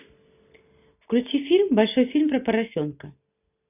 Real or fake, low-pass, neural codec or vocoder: real; 3.6 kHz; none